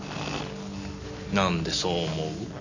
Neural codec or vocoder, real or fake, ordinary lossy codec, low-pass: none; real; none; 7.2 kHz